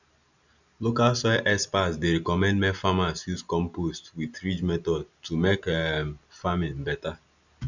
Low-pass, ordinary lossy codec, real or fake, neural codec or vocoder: 7.2 kHz; none; real; none